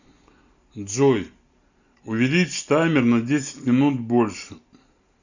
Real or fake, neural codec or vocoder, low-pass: real; none; 7.2 kHz